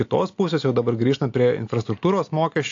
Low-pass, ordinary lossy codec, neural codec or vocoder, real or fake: 7.2 kHz; AAC, 48 kbps; none; real